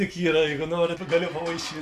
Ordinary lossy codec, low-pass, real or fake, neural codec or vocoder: Opus, 64 kbps; 14.4 kHz; real; none